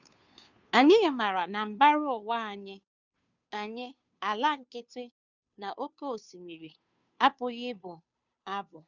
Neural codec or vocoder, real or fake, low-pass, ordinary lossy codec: codec, 16 kHz, 2 kbps, FunCodec, trained on Chinese and English, 25 frames a second; fake; 7.2 kHz; none